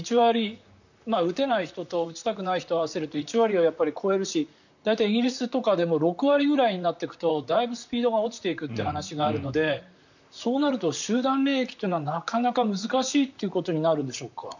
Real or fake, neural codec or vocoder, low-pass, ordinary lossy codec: fake; vocoder, 44.1 kHz, 128 mel bands, Pupu-Vocoder; 7.2 kHz; none